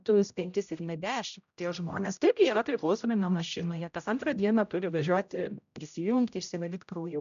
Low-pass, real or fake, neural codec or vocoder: 7.2 kHz; fake; codec, 16 kHz, 0.5 kbps, X-Codec, HuBERT features, trained on general audio